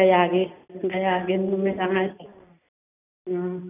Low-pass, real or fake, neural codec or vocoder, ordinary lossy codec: 3.6 kHz; real; none; none